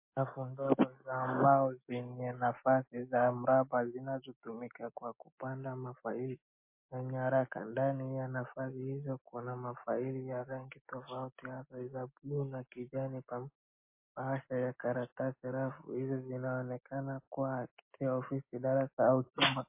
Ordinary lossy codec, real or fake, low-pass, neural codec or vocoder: MP3, 24 kbps; real; 3.6 kHz; none